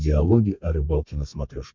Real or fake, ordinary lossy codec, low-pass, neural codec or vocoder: fake; MP3, 64 kbps; 7.2 kHz; codec, 32 kHz, 1.9 kbps, SNAC